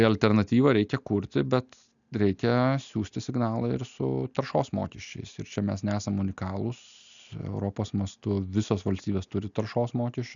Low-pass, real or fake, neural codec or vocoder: 7.2 kHz; real; none